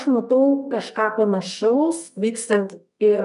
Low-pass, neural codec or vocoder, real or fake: 10.8 kHz; codec, 24 kHz, 0.9 kbps, WavTokenizer, medium music audio release; fake